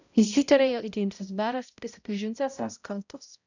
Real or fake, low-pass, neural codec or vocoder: fake; 7.2 kHz; codec, 16 kHz, 0.5 kbps, X-Codec, HuBERT features, trained on balanced general audio